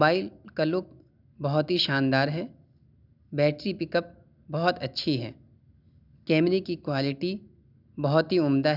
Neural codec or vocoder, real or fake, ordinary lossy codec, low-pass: none; real; none; 5.4 kHz